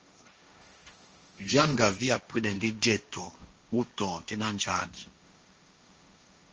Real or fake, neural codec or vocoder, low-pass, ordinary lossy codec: fake; codec, 16 kHz, 1.1 kbps, Voila-Tokenizer; 7.2 kHz; Opus, 24 kbps